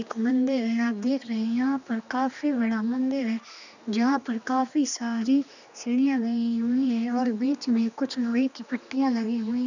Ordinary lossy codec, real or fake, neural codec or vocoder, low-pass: none; fake; codec, 16 kHz, 2 kbps, X-Codec, HuBERT features, trained on general audio; 7.2 kHz